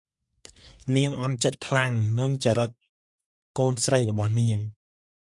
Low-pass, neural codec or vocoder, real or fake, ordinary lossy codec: 10.8 kHz; codec, 24 kHz, 1 kbps, SNAC; fake; MP3, 64 kbps